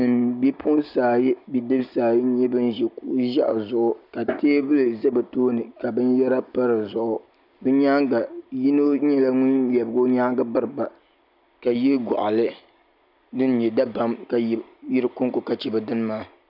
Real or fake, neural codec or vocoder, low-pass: real; none; 5.4 kHz